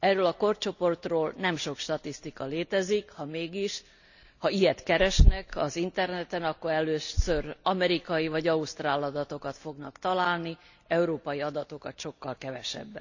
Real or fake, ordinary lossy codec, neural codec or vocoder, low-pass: real; none; none; 7.2 kHz